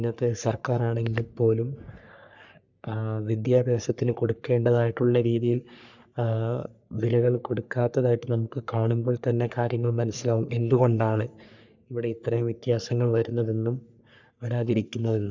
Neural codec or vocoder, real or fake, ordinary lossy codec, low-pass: codec, 44.1 kHz, 3.4 kbps, Pupu-Codec; fake; none; 7.2 kHz